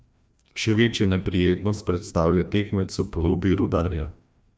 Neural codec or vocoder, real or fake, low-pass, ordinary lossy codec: codec, 16 kHz, 1 kbps, FreqCodec, larger model; fake; none; none